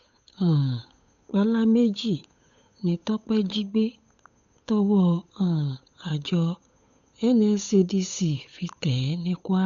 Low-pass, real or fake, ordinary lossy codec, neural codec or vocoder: 7.2 kHz; fake; none; codec, 16 kHz, 8 kbps, FunCodec, trained on Chinese and English, 25 frames a second